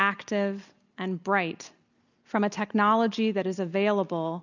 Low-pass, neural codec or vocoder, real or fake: 7.2 kHz; none; real